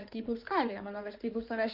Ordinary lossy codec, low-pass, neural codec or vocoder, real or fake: Opus, 24 kbps; 5.4 kHz; codec, 16 kHz in and 24 kHz out, 2.2 kbps, FireRedTTS-2 codec; fake